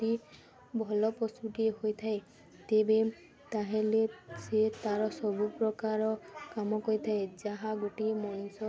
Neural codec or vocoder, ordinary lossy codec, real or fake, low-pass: none; none; real; none